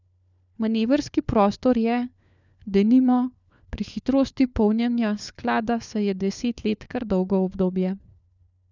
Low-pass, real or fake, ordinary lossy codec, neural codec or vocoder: 7.2 kHz; fake; none; codec, 16 kHz, 4 kbps, FunCodec, trained on LibriTTS, 50 frames a second